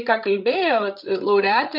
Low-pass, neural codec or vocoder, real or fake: 5.4 kHz; codec, 16 kHz, 8 kbps, FreqCodec, larger model; fake